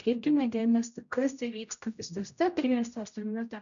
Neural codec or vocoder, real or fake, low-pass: codec, 16 kHz, 0.5 kbps, X-Codec, HuBERT features, trained on general audio; fake; 7.2 kHz